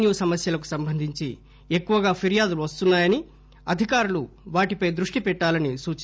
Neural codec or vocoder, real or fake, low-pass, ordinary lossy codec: none; real; none; none